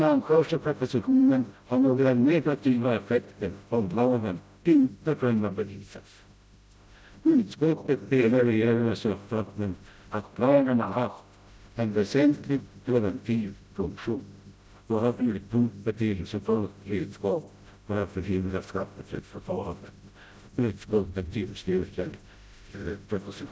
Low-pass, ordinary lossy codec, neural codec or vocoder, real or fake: none; none; codec, 16 kHz, 0.5 kbps, FreqCodec, smaller model; fake